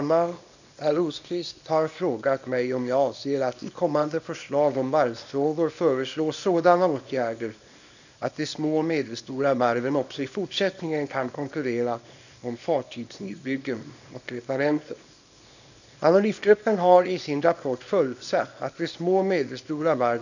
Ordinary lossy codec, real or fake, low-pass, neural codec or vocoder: none; fake; 7.2 kHz; codec, 24 kHz, 0.9 kbps, WavTokenizer, small release